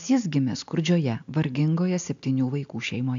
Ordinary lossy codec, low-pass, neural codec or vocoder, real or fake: AAC, 64 kbps; 7.2 kHz; none; real